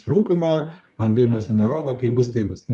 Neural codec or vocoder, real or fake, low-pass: codec, 24 kHz, 1 kbps, SNAC; fake; 10.8 kHz